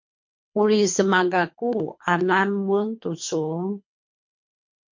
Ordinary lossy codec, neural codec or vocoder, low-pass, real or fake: MP3, 48 kbps; codec, 24 kHz, 3 kbps, HILCodec; 7.2 kHz; fake